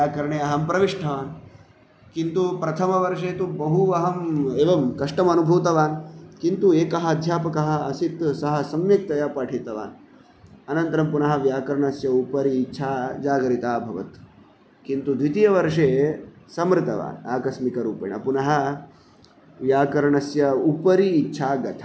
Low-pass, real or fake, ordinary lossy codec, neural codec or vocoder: none; real; none; none